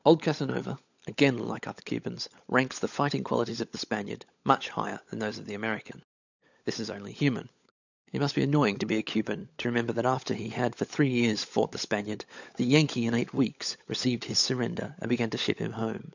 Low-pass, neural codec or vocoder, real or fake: 7.2 kHz; codec, 16 kHz, 16 kbps, FunCodec, trained on LibriTTS, 50 frames a second; fake